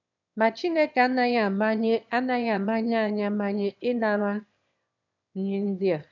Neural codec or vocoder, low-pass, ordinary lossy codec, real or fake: autoencoder, 22.05 kHz, a latent of 192 numbers a frame, VITS, trained on one speaker; 7.2 kHz; none; fake